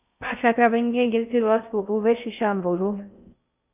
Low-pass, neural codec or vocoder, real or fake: 3.6 kHz; codec, 16 kHz in and 24 kHz out, 0.6 kbps, FocalCodec, streaming, 4096 codes; fake